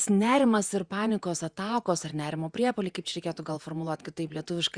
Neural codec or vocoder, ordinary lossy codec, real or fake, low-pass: vocoder, 48 kHz, 128 mel bands, Vocos; Opus, 64 kbps; fake; 9.9 kHz